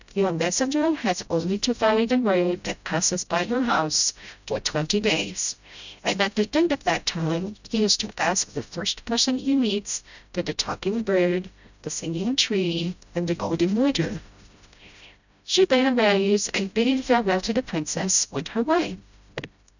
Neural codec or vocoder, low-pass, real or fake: codec, 16 kHz, 0.5 kbps, FreqCodec, smaller model; 7.2 kHz; fake